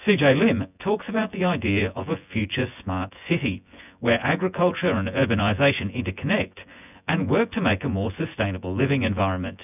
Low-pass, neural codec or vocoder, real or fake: 3.6 kHz; vocoder, 24 kHz, 100 mel bands, Vocos; fake